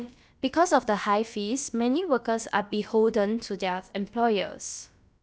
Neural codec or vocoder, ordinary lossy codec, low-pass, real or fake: codec, 16 kHz, about 1 kbps, DyCAST, with the encoder's durations; none; none; fake